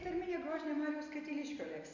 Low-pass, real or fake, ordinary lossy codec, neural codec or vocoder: 7.2 kHz; real; AAC, 48 kbps; none